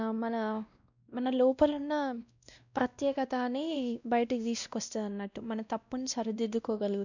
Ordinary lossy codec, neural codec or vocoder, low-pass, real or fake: none; codec, 16 kHz, 1 kbps, X-Codec, WavLM features, trained on Multilingual LibriSpeech; 7.2 kHz; fake